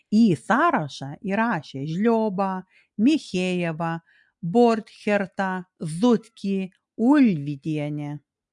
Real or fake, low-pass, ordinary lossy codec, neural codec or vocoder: real; 10.8 kHz; MP3, 64 kbps; none